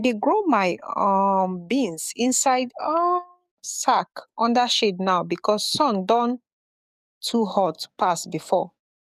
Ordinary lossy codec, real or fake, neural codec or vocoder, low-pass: none; fake; codec, 44.1 kHz, 7.8 kbps, DAC; 14.4 kHz